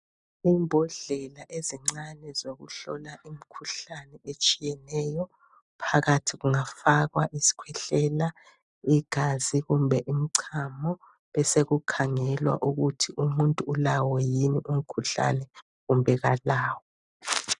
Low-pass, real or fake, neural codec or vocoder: 10.8 kHz; real; none